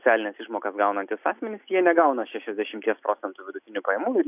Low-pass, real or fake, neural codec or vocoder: 3.6 kHz; real; none